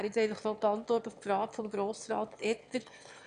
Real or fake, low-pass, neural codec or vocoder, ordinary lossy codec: fake; 9.9 kHz; autoencoder, 22.05 kHz, a latent of 192 numbers a frame, VITS, trained on one speaker; none